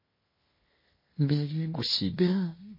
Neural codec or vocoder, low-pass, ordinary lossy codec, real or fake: codec, 16 kHz in and 24 kHz out, 0.9 kbps, LongCat-Audio-Codec, fine tuned four codebook decoder; 5.4 kHz; MP3, 24 kbps; fake